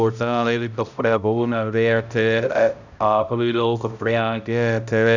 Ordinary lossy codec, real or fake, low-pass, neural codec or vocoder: none; fake; 7.2 kHz; codec, 16 kHz, 0.5 kbps, X-Codec, HuBERT features, trained on balanced general audio